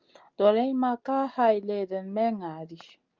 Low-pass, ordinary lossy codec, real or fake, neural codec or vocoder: 7.2 kHz; Opus, 24 kbps; real; none